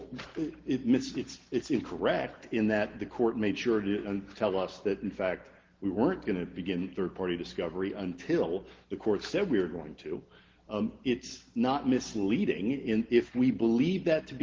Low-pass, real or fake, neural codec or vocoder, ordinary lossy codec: 7.2 kHz; real; none; Opus, 16 kbps